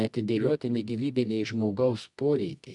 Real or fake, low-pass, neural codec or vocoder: fake; 10.8 kHz; codec, 24 kHz, 0.9 kbps, WavTokenizer, medium music audio release